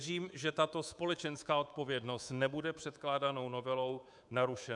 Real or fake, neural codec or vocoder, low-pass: fake; codec, 24 kHz, 3.1 kbps, DualCodec; 10.8 kHz